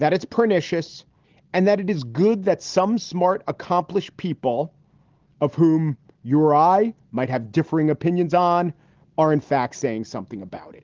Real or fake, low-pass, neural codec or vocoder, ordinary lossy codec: real; 7.2 kHz; none; Opus, 16 kbps